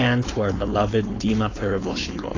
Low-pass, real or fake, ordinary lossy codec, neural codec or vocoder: 7.2 kHz; fake; AAC, 32 kbps; codec, 16 kHz, 4.8 kbps, FACodec